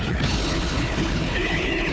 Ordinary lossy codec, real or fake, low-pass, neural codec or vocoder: none; fake; none; codec, 16 kHz, 4 kbps, FunCodec, trained on Chinese and English, 50 frames a second